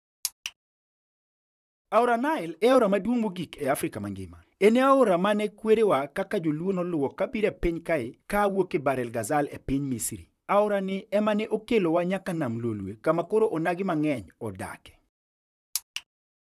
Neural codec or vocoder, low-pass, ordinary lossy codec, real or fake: vocoder, 44.1 kHz, 128 mel bands, Pupu-Vocoder; 14.4 kHz; none; fake